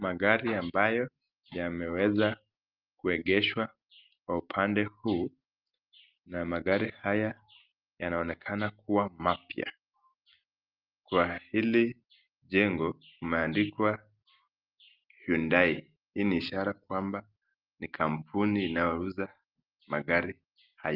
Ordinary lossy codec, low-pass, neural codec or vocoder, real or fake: Opus, 32 kbps; 5.4 kHz; none; real